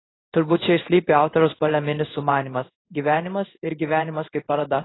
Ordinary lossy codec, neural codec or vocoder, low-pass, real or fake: AAC, 16 kbps; codec, 16 kHz in and 24 kHz out, 1 kbps, XY-Tokenizer; 7.2 kHz; fake